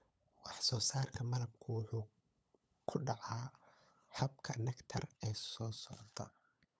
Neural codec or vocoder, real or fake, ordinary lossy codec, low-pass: codec, 16 kHz, 8 kbps, FunCodec, trained on LibriTTS, 25 frames a second; fake; none; none